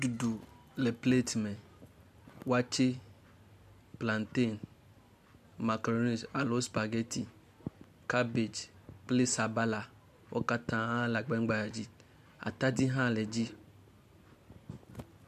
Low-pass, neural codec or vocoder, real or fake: 14.4 kHz; none; real